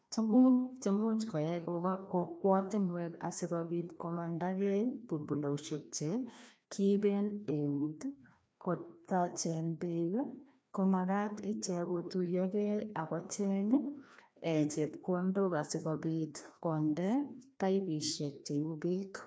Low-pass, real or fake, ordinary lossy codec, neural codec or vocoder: none; fake; none; codec, 16 kHz, 1 kbps, FreqCodec, larger model